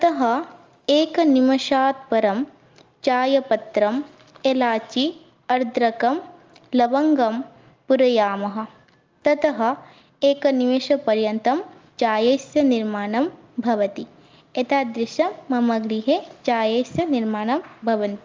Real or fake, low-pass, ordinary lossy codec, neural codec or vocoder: real; 7.2 kHz; Opus, 32 kbps; none